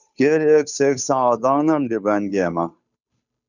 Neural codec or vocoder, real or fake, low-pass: codec, 16 kHz, 2 kbps, FunCodec, trained on Chinese and English, 25 frames a second; fake; 7.2 kHz